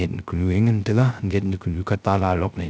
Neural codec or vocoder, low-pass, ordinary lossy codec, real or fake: codec, 16 kHz, 0.3 kbps, FocalCodec; none; none; fake